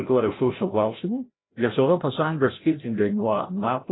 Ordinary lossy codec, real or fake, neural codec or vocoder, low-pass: AAC, 16 kbps; fake; codec, 16 kHz, 0.5 kbps, FreqCodec, larger model; 7.2 kHz